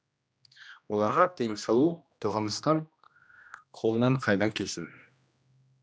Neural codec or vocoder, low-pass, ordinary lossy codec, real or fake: codec, 16 kHz, 1 kbps, X-Codec, HuBERT features, trained on general audio; none; none; fake